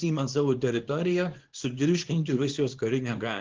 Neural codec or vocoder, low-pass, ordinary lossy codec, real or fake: codec, 24 kHz, 0.9 kbps, WavTokenizer, small release; 7.2 kHz; Opus, 16 kbps; fake